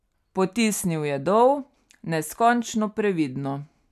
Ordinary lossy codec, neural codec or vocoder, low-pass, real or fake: none; none; 14.4 kHz; real